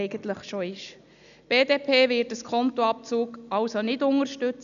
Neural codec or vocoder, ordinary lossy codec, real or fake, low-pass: none; none; real; 7.2 kHz